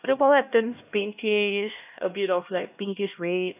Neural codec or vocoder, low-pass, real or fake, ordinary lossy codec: codec, 16 kHz, 1 kbps, X-Codec, HuBERT features, trained on LibriSpeech; 3.6 kHz; fake; none